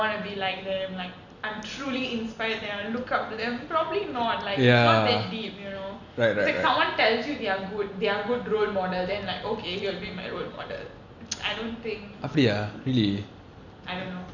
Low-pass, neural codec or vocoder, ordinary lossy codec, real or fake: 7.2 kHz; none; none; real